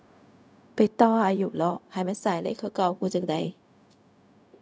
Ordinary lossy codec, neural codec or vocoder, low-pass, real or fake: none; codec, 16 kHz, 0.4 kbps, LongCat-Audio-Codec; none; fake